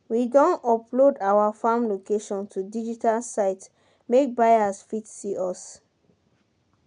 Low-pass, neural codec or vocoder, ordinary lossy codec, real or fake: 9.9 kHz; none; none; real